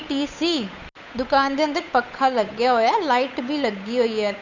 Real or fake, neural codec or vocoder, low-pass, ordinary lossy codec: fake; codec, 16 kHz, 8 kbps, FunCodec, trained on Chinese and English, 25 frames a second; 7.2 kHz; none